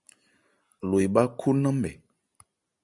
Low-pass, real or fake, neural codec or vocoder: 10.8 kHz; real; none